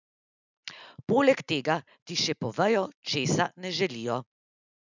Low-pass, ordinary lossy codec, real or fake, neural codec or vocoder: 7.2 kHz; none; real; none